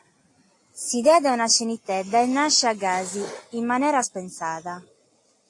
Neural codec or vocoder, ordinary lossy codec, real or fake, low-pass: none; AAC, 48 kbps; real; 10.8 kHz